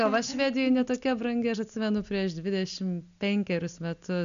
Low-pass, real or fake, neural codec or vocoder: 7.2 kHz; real; none